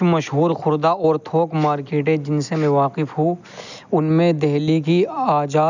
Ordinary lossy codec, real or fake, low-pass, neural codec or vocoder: none; real; 7.2 kHz; none